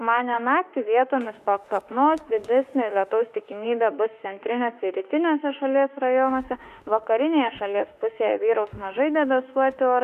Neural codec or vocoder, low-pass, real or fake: autoencoder, 48 kHz, 32 numbers a frame, DAC-VAE, trained on Japanese speech; 14.4 kHz; fake